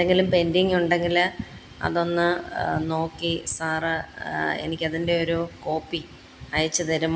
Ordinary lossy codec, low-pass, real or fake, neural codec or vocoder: none; none; real; none